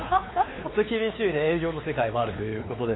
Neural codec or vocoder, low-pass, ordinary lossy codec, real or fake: codec, 16 kHz, 4 kbps, X-Codec, WavLM features, trained on Multilingual LibriSpeech; 7.2 kHz; AAC, 16 kbps; fake